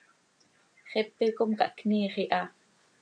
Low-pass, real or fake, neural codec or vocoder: 9.9 kHz; fake; vocoder, 24 kHz, 100 mel bands, Vocos